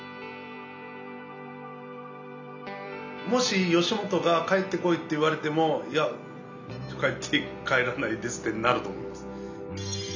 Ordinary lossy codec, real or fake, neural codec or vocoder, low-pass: none; real; none; 7.2 kHz